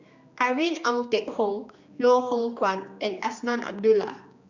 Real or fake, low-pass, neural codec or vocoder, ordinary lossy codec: fake; 7.2 kHz; codec, 16 kHz, 2 kbps, X-Codec, HuBERT features, trained on general audio; Opus, 64 kbps